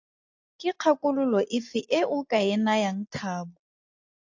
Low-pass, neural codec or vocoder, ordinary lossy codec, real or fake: 7.2 kHz; none; AAC, 48 kbps; real